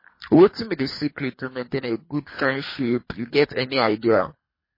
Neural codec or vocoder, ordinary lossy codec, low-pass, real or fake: codec, 24 kHz, 3 kbps, HILCodec; MP3, 24 kbps; 5.4 kHz; fake